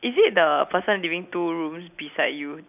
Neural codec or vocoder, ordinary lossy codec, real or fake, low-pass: none; none; real; 3.6 kHz